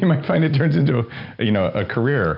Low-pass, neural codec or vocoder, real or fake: 5.4 kHz; none; real